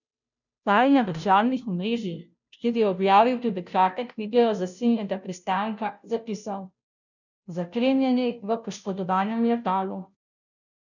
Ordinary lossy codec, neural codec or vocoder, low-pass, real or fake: none; codec, 16 kHz, 0.5 kbps, FunCodec, trained on Chinese and English, 25 frames a second; 7.2 kHz; fake